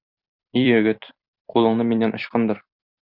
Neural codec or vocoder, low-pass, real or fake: vocoder, 44.1 kHz, 128 mel bands every 256 samples, BigVGAN v2; 5.4 kHz; fake